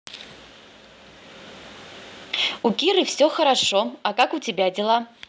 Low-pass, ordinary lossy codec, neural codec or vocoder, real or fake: none; none; none; real